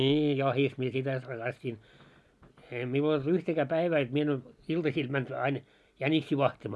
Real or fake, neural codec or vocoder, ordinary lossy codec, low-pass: real; none; none; none